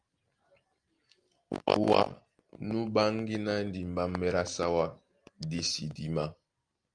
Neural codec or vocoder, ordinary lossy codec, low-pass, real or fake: none; Opus, 32 kbps; 9.9 kHz; real